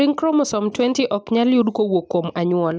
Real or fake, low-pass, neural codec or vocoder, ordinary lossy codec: real; none; none; none